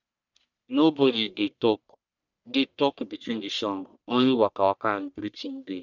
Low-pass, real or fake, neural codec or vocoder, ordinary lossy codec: 7.2 kHz; fake; codec, 44.1 kHz, 1.7 kbps, Pupu-Codec; none